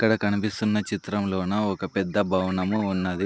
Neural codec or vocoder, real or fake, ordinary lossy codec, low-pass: none; real; none; none